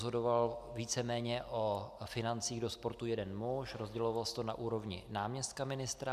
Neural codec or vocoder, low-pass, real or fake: none; 14.4 kHz; real